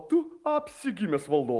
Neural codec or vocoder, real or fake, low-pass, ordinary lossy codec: none; real; 10.8 kHz; Opus, 24 kbps